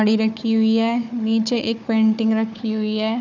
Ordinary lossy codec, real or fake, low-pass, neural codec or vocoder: none; fake; 7.2 kHz; codec, 16 kHz, 4 kbps, FunCodec, trained on Chinese and English, 50 frames a second